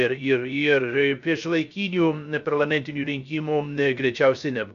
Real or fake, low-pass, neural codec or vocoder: fake; 7.2 kHz; codec, 16 kHz, 0.3 kbps, FocalCodec